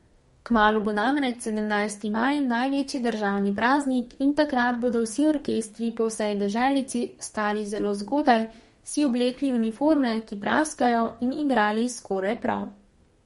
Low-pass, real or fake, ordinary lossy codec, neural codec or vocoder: 14.4 kHz; fake; MP3, 48 kbps; codec, 32 kHz, 1.9 kbps, SNAC